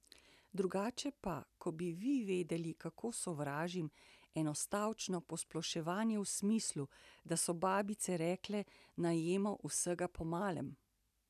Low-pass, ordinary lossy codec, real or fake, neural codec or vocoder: 14.4 kHz; none; real; none